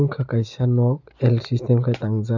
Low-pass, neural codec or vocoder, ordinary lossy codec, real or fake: 7.2 kHz; none; none; real